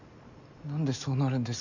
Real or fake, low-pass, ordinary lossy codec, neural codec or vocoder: real; 7.2 kHz; none; none